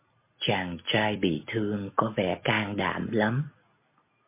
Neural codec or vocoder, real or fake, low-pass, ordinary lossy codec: none; real; 3.6 kHz; MP3, 24 kbps